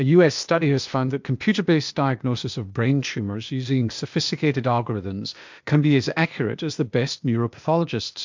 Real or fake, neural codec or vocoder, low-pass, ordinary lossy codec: fake; codec, 16 kHz, 0.8 kbps, ZipCodec; 7.2 kHz; MP3, 64 kbps